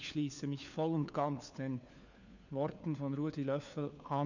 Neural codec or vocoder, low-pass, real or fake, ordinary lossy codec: codec, 16 kHz, 4 kbps, FunCodec, trained on LibriTTS, 50 frames a second; 7.2 kHz; fake; none